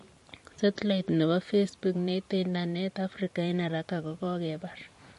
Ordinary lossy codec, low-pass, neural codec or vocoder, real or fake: MP3, 48 kbps; 14.4 kHz; vocoder, 44.1 kHz, 128 mel bands every 512 samples, BigVGAN v2; fake